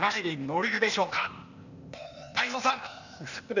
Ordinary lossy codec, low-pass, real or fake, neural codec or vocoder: none; 7.2 kHz; fake; codec, 16 kHz, 0.8 kbps, ZipCodec